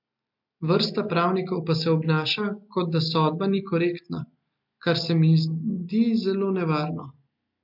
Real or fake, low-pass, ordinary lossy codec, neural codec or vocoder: real; 5.4 kHz; MP3, 48 kbps; none